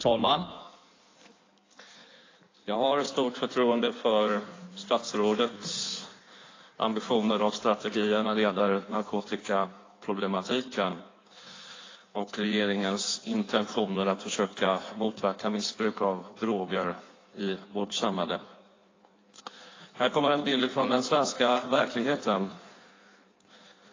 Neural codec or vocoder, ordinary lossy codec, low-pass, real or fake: codec, 16 kHz in and 24 kHz out, 1.1 kbps, FireRedTTS-2 codec; AAC, 32 kbps; 7.2 kHz; fake